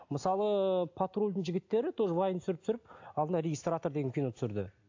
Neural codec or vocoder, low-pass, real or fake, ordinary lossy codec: none; 7.2 kHz; real; AAC, 48 kbps